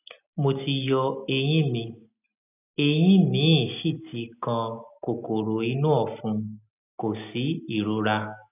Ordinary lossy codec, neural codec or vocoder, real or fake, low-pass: none; none; real; 3.6 kHz